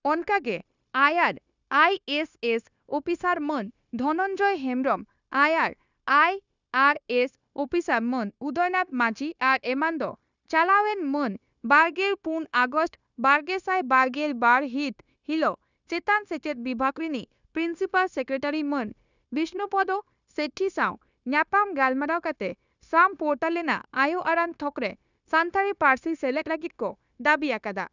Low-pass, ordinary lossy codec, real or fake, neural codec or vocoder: 7.2 kHz; none; fake; codec, 16 kHz, 0.9 kbps, LongCat-Audio-Codec